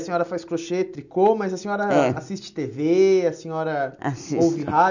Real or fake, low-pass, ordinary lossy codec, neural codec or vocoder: real; 7.2 kHz; none; none